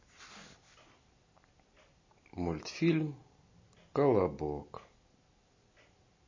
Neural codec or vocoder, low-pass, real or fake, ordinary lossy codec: none; 7.2 kHz; real; MP3, 32 kbps